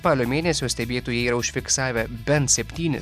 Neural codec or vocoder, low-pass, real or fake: none; 14.4 kHz; real